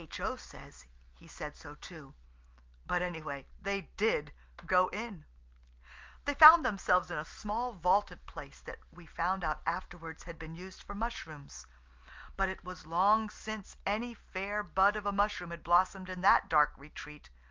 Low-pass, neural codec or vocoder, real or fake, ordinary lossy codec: 7.2 kHz; none; real; Opus, 24 kbps